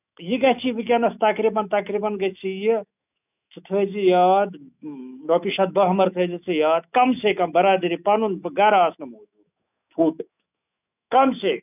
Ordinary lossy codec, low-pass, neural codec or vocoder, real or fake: none; 3.6 kHz; autoencoder, 48 kHz, 128 numbers a frame, DAC-VAE, trained on Japanese speech; fake